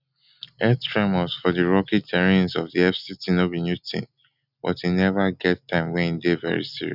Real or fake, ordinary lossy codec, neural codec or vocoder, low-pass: real; none; none; 5.4 kHz